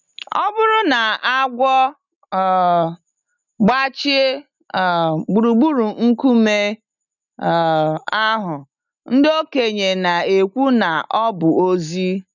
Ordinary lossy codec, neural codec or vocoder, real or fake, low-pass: none; none; real; 7.2 kHz